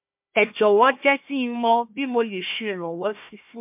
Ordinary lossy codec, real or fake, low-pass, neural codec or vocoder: MP3, 32 kbps; fake; 3.6 kHz; codec, 16 kHz, 1 kbps, FunCodec, trained on Chinese and English, 50 frames a second